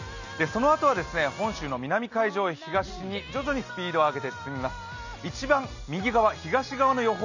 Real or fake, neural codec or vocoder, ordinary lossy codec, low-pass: real; none; none; 7.2 kHz